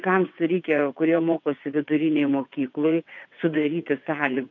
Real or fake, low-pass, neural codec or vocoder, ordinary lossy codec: fake; 7.2 kHz; vocoder, 22.05 kHz, 80 mel bands, WaveNeXt; MP3, 48 kbps